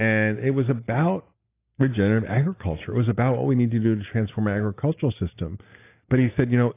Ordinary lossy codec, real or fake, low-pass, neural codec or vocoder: AAC, 24 kbps; real; 3.6 kHz; none